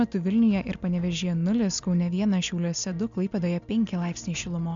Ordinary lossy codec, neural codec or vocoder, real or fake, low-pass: MP3, 64 kbps; none; real; 7.2 kHz